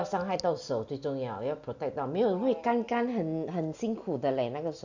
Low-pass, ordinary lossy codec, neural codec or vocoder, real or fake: 7.2 kHz; Opus, 64 kbps; none; real